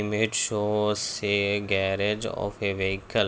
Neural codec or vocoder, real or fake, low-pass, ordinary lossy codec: none; real; none; none